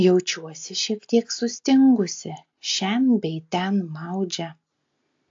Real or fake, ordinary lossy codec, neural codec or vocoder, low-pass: real; AAC, 48 kbps; none; 7.2 kHz